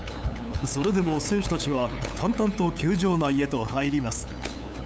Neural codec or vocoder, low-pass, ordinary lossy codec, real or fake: codec, 16 kHz, 8 kbps, FunCodec, trained on LibriTTS, 25 frames a second; none; none; fake